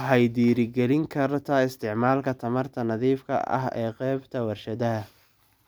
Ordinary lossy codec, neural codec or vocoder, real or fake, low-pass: none; none; real; none